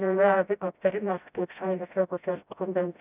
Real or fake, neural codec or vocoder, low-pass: fake; codec, 16 kHz, 0.5 kbps, FreqCodec, smaller model; 3.6 kHz